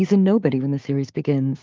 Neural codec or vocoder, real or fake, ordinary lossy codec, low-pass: codec, 16 kHz, 6 kbps, DAC; fake; Opus, 24 kbps; 7.2 kHz